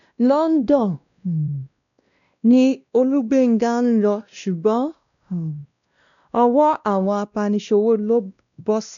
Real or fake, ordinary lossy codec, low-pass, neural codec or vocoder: fake; none; 7.2 kHz; codec, 16 kHz, 1 kbps, X-Codec, WavLM features, trained on Multilingual LibriSpeech